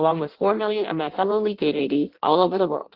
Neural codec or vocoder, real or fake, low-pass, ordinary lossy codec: codec, 16 kHz in and 24 kHz out, 0.6 kbps, FireRedTTS-2 codec; fake; 5.4 kHz; Opus, 16 kbps